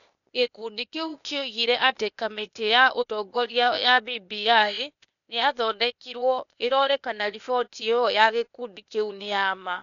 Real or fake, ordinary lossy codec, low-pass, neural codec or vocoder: fake; none; 7.2 kHz; codec, 16 kHz, 0.8 kbps, ZipCodec